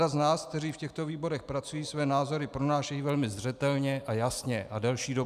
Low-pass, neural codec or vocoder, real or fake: 14.4 kHz; none; real